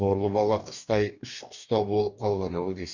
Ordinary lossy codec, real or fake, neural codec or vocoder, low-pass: none; fake; codec, 44.1 kHz, 2.6 kbps, DAC; 7.2 kHz